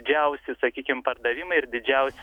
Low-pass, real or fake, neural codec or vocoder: 19.8 kHz; fake; autoencoder, 48 kHz, 128 numbers a frame, DAC-VAE, trained on Japanese speech